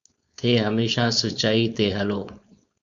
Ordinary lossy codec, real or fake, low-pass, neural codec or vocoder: Opus, 64 kbps; fake; 7.2 kHz; codec, 16 kHz, 4.8 kbps, FACodec